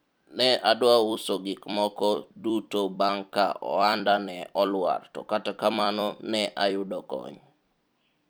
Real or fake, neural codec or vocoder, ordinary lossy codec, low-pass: fake; vocoder, 44.1 kHz, 128 mel bands every 256 samples, BigVGAN v2; none; none